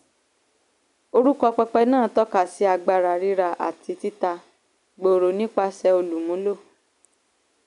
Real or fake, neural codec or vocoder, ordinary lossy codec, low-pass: real; none; none; 10.8 kHz